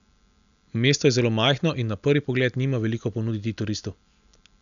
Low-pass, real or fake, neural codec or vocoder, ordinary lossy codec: 7.2 kHz; real; none; none